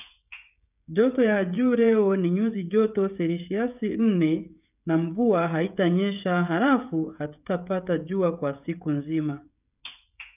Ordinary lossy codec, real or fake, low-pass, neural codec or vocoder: none; fake; 3.6 kHz; codec, 16 kHz, 16 kbps, FreqCodec, smaller model